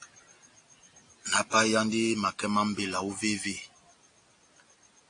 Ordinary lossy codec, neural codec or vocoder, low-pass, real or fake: AAC, 48 kbps; none; 9.9 kHz; real